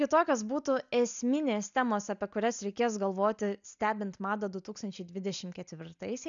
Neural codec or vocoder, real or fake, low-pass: none; real; 7.2 kHz